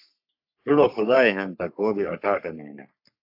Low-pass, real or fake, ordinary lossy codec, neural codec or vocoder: 5.4 kHz; fake; AAC, 48 kbps; codec, 44.1 kHz, 3.4 kbps, Pupu-Codec